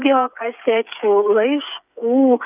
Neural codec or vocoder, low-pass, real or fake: codec, 16 kHz, 8 kbps, FreqCodec, smaller model; 3.6 kHz; fake